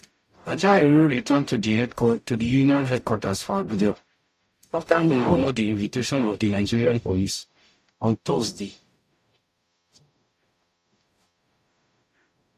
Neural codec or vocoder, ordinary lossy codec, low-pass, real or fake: codec, 44.1 kHz, 0.9 kbps, DAC; AAC, 64 kbps; 14.4 kHz; fake